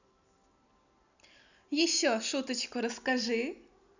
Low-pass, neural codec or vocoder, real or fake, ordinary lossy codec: 7.2 kHz; none; real; none